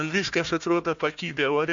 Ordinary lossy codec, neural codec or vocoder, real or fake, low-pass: MP3, 64 kbps; codec, 16 kHz, 1 kbps, FunCodec, trained on Chinese and English, 50 frames a second; fake; 7.2 kHz